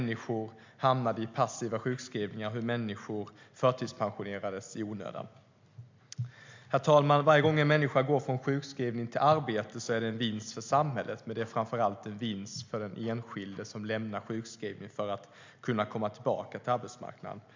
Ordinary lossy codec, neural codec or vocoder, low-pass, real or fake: MP3, 64 kbps; none; 7.2 kHz; real